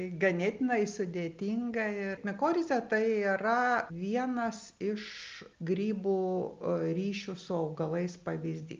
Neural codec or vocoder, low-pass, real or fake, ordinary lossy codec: none; 7.2 kHz; real; Opus, 24 kbps